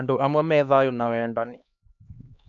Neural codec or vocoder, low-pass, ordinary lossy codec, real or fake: codec, 16 kHz, 2 kbps, X-Codec, HuBERT features, trained on LibriSpeech; 7.2 kHz; AAC, 64 kbps; fake